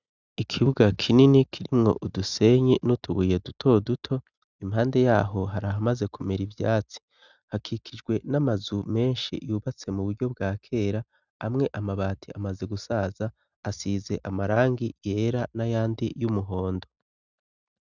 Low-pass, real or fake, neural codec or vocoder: 7.2 kHz; real; none